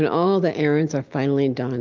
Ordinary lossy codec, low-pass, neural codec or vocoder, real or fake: Opus, 24 kbps; 7.2 kHz; none; real